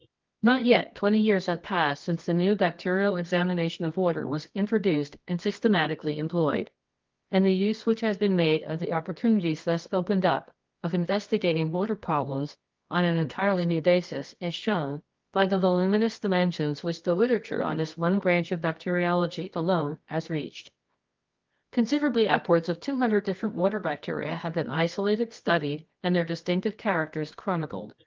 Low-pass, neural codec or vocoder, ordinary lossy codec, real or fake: 7.2 kHz; codec, 24 kHz, 0.9 kbps, WavTokenizer, medium music audio release; Opus, 32 kbps; fake